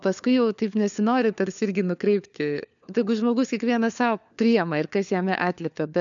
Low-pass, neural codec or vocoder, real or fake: 7.2 kHz; codec, 16 kHz, 4 kbps, FunCodec, trained on LibriTTS, 50 frames a second; fake